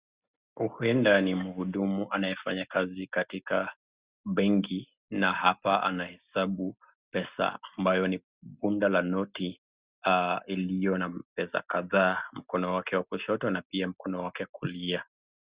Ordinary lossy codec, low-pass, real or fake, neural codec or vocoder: Opus, 64 kbps; 3.6 kHz; real; none